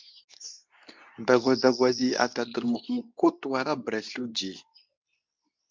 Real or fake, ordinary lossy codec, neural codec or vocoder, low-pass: fake; MP3, 64 kbps; codec, 24 kHz, 0.9 kbps, WavTokenizer, medium speech release version 2; 7.2 kHz